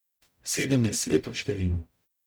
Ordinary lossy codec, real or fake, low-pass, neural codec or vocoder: none; fake; none; codec, 44.1 kHz, 0.9 kbps, DAC